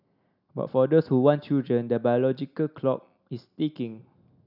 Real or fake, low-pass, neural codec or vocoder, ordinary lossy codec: real; 5.4 kHz; none; none